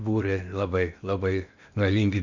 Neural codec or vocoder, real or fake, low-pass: codec, 16 kHz in and 24 kHz out, 0.8 kbps, FocalCodec, streaming, 65536 codes; fake; 7.2 kHz